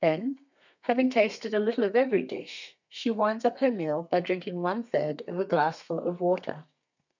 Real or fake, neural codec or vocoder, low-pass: fake; codec, 32 kHz, 1.9 kbps, SNAC; 7.2 kHz